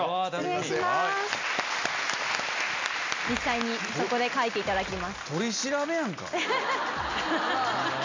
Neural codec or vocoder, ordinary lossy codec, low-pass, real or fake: none; MP3, 64 kbps; 7.2 kHz; real